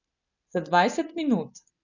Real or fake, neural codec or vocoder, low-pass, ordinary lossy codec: real; none; 7.2 kHz; none